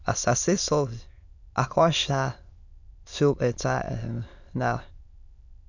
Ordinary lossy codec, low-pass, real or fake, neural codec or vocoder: none; 7.2 kHz; fake; autoencoder, 22.05 kHz, a latent of 192 numbers a frame, VITS, trained on many speakers